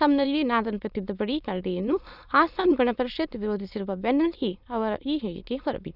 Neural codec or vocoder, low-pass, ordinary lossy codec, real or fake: autoencoder, 22.05 kHz, a latent of 192 numbers a frame, VITS, trained on many speakers; 5.4 kHz; none; fake